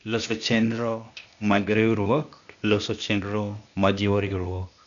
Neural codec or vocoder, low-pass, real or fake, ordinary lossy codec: codec, 16 kHz, 0.8 kbps, ZipCodec; 7.2 kHz; fake; none